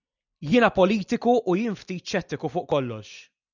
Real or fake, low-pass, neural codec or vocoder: real; 7.2 kHz; none